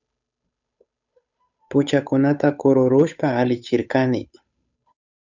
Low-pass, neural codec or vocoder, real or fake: 7.2 kHz; codec, 16 kHz, 8 kbps, FunCodec, trained on Chinese and English, 25 frames a second; fake